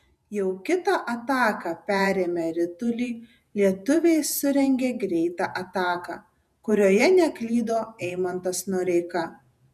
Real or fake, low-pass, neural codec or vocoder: fake; 14.4 kHz; vocoder, 48 kHz, 128 mel bands, Vocos